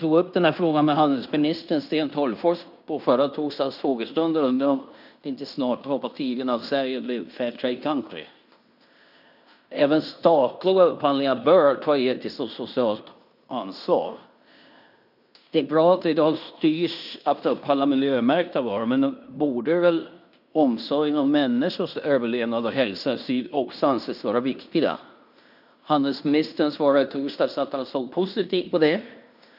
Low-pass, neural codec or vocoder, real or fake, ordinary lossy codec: 5.4 kHz; codec, 16 kHz in and 24 kHz out, 0.9 kbps, LongCat-Audio-Codec, fine tuned four codebook decoder; fake; none